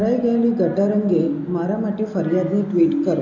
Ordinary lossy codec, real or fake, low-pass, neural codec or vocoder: none; real; 7.2 kHz; none